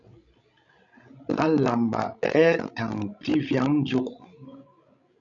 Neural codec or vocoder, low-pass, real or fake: codec, 16 kHz, 16 kbps, FreqCodec, larger model; 7.2 kHz; fake